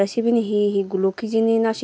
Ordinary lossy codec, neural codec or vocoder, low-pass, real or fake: none; none; none; real